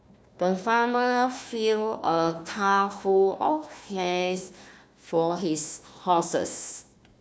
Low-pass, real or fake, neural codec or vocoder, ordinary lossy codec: none; fake; codec, 16 kHz, 1 kbps, FunCodec, trained on Chinese and English, 50 frames a second; none